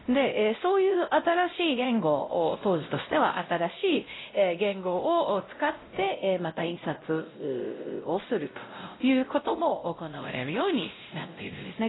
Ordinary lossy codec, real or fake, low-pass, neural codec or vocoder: AAC, 16 kbps; fake; 7.2 kHz; codec, 16 kHz, 0.5 kbps, X-Codec, WavLM features, trained on Multilingual LibriSpeech